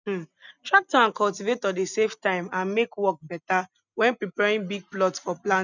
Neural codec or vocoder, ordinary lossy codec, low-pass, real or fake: none; none; 7.2 kHz; real